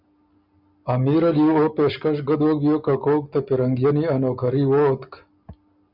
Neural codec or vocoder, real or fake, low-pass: none; real; 5.4 kHz